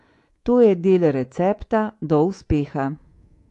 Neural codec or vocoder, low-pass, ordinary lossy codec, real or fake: codec, 24 kHz, 3.1 kbps, DualCodec; 10.8 kHz; AAC, 48 kbps; fake